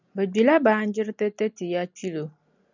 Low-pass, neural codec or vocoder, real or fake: 7.2 kHz; none; real